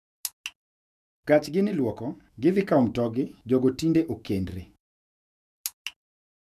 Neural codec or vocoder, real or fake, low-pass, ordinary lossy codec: autoencoder, 48 kHz, 128 numbers a frame, DAC-VAE, trained on Japanese speech; fake; 14.4 kHz; none